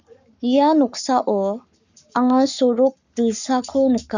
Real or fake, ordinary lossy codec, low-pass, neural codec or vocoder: fake; none; 7.2 kHz; codec, 44.1 kHz, 7.8 kbps, Pupu-Codec